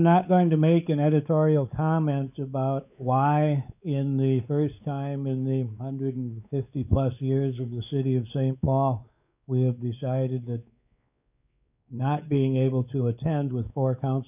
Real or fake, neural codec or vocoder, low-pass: fake; codec, 16 kHz, 4 kbps, X-Codec, WavLM features, trained on Multilingual LibriSpeech; 3.6 kHz